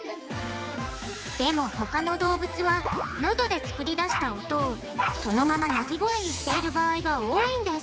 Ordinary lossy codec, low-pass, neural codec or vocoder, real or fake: none; none; codec, 16 kHz, 4 kbps, X-Codec, HuBERT features, trained on general audio; fake